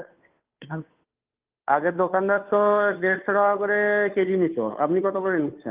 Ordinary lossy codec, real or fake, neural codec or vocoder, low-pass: Opus, 32 kbps; fake; codec, 16 kHz, 2 kbps, FunCodec, trained on Chinese and English, 25 frames a second; 3.6 kHz